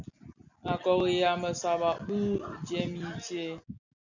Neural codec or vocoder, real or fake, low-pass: none; real; 7.2 kHz